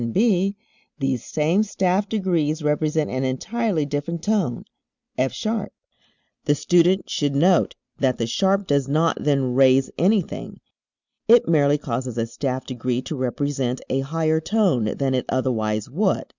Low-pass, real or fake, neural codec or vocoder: 7.2 kHz; real; none